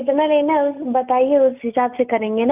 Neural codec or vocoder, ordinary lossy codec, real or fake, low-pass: none; none; real; 3.6 kHz